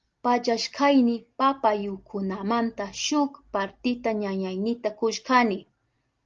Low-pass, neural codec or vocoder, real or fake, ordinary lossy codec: 7.2 kHz; none; real; Opus, 32 kbps